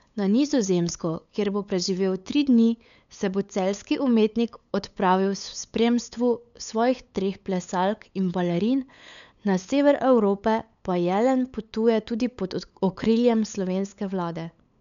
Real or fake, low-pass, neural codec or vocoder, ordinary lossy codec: fake; 7.2 kHz; codec, 16 kHz, 8 kbps, FunCodec, trained on LibriTTS, 25 frames a second; MP3, 96 kbps